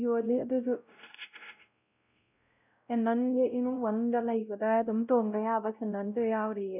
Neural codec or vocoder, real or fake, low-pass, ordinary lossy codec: codec, 16 kHz, 0.5 kbps, X-Codec, WavLM features, trained on Multilingual LibriSpeech; fake; 3.6 kHz; none